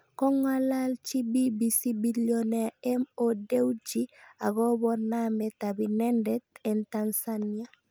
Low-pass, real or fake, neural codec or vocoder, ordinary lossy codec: none; real; none; none